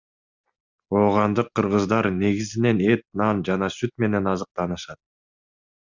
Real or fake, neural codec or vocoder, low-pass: real; none; 7.2 kHz